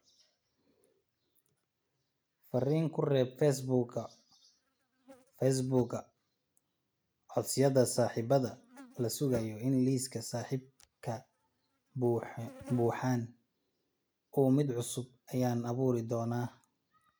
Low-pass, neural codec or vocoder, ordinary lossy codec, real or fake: none; none; none; real